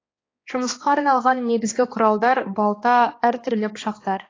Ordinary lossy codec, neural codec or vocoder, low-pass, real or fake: AAC, 32 kbps; codec, 16 kHz, 2 kbps, X-Codec, HuBERT features, trained on balanced general audio; 7.2 kHz; fake